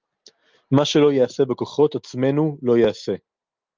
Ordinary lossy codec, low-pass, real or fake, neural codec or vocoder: Opus, 24 kbps; 7.2 kHz; real; none